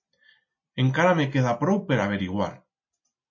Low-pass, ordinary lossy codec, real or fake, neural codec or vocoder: 7.2 kHz; MP3, 32 kbps; real; none